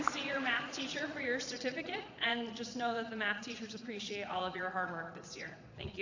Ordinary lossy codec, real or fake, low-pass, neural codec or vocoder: AAC, 48 kbps; fake; 7.2 kHz; vocoder, 22.05 kHz, 80 mel bands, Vocos